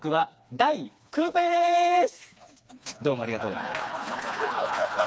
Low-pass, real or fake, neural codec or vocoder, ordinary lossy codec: none; fake; codec, 16 kHz, 2 kbps, FreqCodec, smaller model; none